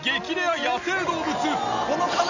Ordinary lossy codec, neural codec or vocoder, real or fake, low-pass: none; none; real; 7.2 kHz